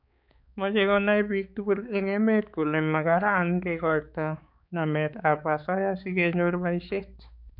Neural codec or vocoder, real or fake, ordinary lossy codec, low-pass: codec, 16 kHz, 4 kbps, X-Codec, HuBERT features, trained on balanced general audio; fake; none; 5.4 kHz